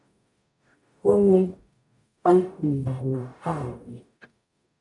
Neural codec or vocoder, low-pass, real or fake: codec, 44.1 kHz, 0.9 kbps, DAC; 10.8 kHz; fake